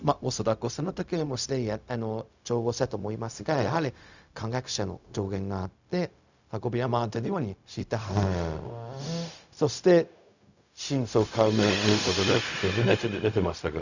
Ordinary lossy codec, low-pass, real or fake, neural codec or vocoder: none; 7.2 kHz; fake; codec, 16 kHz, 0.4 kbps, LongCat-Audio-Codec